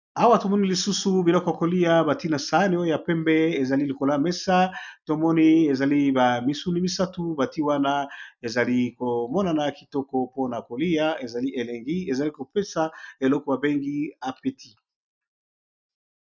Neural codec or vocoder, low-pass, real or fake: none; 7.2 kHz; real